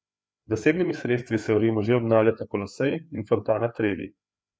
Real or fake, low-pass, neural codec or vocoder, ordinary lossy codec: fake; none; codec, 16 kHz, 4 kbps, FreqCodec, larger model; none